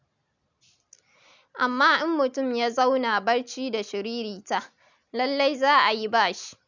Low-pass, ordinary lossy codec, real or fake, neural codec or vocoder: 7.2 kHz; none; real; none